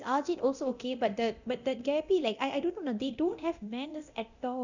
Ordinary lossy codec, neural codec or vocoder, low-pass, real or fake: none; codec, 24 kHz, 0.9 kbps, DualCodec; 7.2 kHz; fake